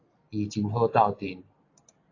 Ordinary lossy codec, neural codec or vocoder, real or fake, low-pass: AAC, 32 kbps; none; real; 7.2 kHz